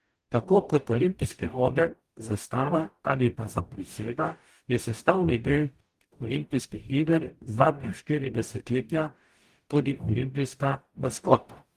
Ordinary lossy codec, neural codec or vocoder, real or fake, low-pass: Opus, 24 kbps; codec, 44.1 kHz, 0.9 kbps, DAC; fake; 14.4 kHz